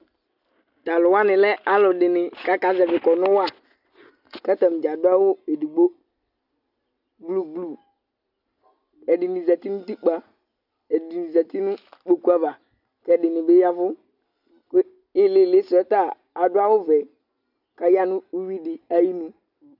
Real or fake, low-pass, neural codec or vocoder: real; 5.4 kHz; none